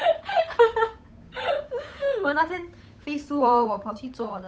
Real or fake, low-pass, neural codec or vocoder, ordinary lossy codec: fake; none; codec, 16 kHz, 8 kbps, FunCodec, trained on Chinese and English, 25 frames a second; none